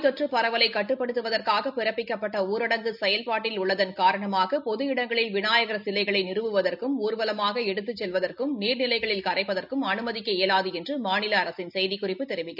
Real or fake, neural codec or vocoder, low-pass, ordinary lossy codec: real; none; 5.4 kHz; none